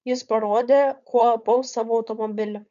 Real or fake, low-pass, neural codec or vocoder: fake; 7.2 kHz; codec, 16 kHz, 4.8 kbps, FACodec